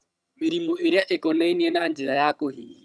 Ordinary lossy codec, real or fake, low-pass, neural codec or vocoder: none; fake; none; vocoder, 22.05 kHz, 80 mel bands, HiFi-GAN